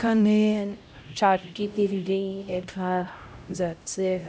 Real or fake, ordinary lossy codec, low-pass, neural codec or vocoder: fake; none; none; codec, 16 kHz, 0.5 kbps, X-Codec, HuBERT features, trained on LibriSpeech